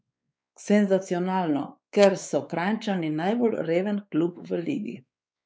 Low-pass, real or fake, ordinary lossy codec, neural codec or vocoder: none; fake; none; codec, 16 kHz, 4 kbps, X-Codec, WavLM features, trained on Multilingual LibriSpeech